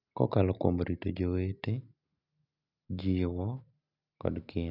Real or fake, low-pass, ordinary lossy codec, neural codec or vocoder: real; 5.4 kHz; none; none